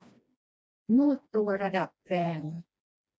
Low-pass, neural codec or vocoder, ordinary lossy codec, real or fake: none; codec, 16 kHz, 1 kbps, FreqCodec, smaller model; none; fake